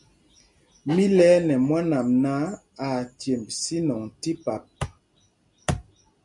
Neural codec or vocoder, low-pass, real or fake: none; 10.8 kHz; real